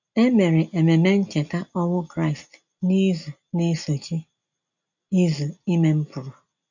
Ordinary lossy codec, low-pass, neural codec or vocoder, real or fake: none; 7.2 kHz; none; real